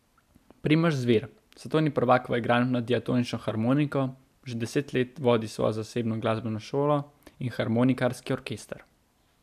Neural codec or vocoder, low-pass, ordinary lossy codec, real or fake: none; 14.4 kHz; AAC, 96 kbps; real